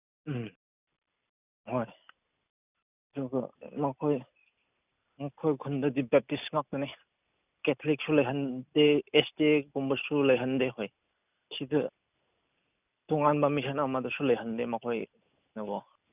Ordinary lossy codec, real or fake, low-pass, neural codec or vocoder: none; real; 3.6 kHz; none